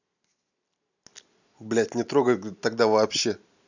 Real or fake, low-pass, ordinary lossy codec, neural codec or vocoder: real; 7.2 kHz; none; none